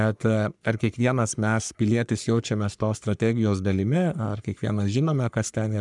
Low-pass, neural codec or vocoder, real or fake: 10.8 kHz; codec, 44.1 kHz, 3.4 kbps, Pupu-Codec; fake